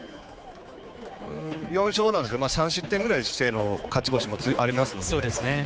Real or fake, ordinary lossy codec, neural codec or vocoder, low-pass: fake; none; codec, 16 kHz, 4 kbps, X-Codec, HuBERT features, trained on general audio; none